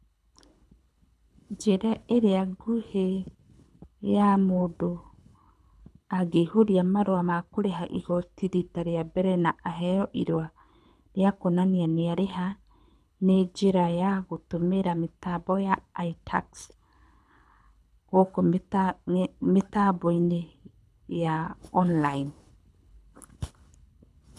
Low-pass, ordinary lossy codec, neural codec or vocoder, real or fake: none; none; codec, 24 kHz, 6 kbps, HILCodec; fake